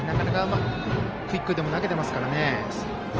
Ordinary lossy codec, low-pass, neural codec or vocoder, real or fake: Opus, 24 kbps; 7.2 kHz; none; real